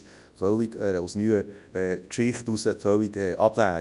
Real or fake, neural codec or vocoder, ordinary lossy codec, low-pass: fake; codec, 24 kHz, 0.9 kbps, WavTokenizer, large speech release; none; 10.8 kHz